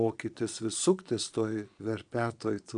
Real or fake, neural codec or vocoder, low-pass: fake; vocoder, 22.05 kHz, 80 mel bands, Vocos; 9.9 kHz